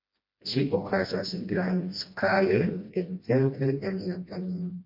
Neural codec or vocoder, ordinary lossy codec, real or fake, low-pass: codec, 16 kHz, 1 kbps, FreqCodec, smaller model; MP3, 32 kbps; fake; 5.4 kHz